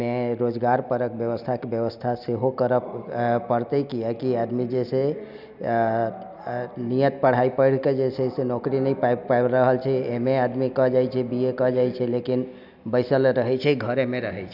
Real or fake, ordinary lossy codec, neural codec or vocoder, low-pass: real; none; none; 5.4 kHz